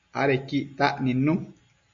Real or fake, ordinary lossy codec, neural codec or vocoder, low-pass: real; MP3, 48 kbps; none; 7.2 kHz